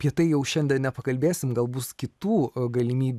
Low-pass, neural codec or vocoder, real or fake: 14.4 kHz; none; real